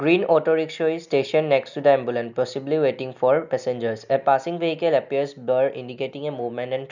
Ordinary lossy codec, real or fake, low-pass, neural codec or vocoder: none; real; 7.2 kHz; none